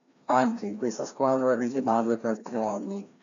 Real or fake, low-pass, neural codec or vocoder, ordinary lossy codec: fake; 7.2 kHz; codec, 16 kHz, 1 kbps, FreqCodec, larger model; MP3, 64 kbps